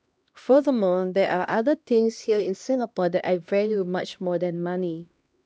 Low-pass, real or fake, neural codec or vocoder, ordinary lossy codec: none; fake; codec, 16 kHz, 1 kbps, X-Codec, HuBERT features, trained on LibriSpeech; none